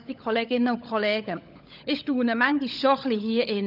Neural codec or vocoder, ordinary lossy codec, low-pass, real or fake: codec, 16 kHz, 16 kbps, FunCodec, trained on LibriTTS, 50 frames a second; none; 5.4 kHz; fake